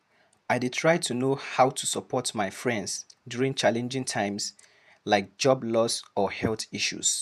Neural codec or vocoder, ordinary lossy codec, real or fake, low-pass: vocoder, 48 kHz, 128 mel bands, Vocos; none; fake; 14.4 kHz